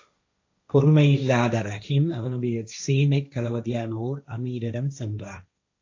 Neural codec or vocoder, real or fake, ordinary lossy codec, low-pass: codec, 16 kHz, 1.1 kbps, Voila-Tokenizer; fake; AAC, 48 kbps; 7.2 kHz